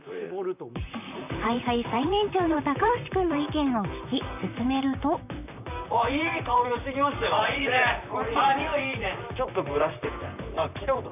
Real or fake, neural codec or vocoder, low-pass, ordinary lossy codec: fake; vocoder, 44.1 kHz, 128 mel bands, Pupu-Vocoder; 3.6 kHz; none